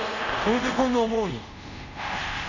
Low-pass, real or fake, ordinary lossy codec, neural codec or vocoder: 7.2 kHz; fake; none; codec, 24 kHz, 0.5 kbps, DualCodec